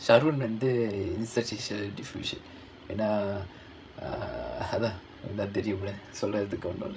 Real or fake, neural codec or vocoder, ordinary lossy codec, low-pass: fake; codec, 16 kHz, 16 kbps, FreqCodec, larger model; none; none